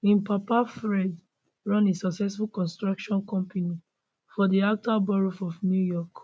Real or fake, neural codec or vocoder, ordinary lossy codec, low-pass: real; none; none; none